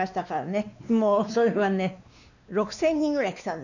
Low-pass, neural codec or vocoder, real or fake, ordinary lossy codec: 7.2 kHz; codec, 16 kHz, 2 kbps, X-Codec, WavLM features, trained on Multilingual LibriSpeech; fake; none